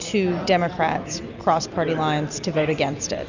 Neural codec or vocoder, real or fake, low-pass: none; real; 7.2 kHz